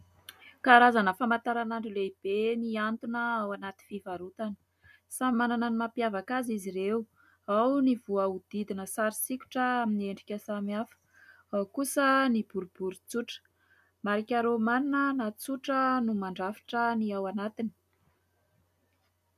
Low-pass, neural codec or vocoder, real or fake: 14.4 kHz; none; real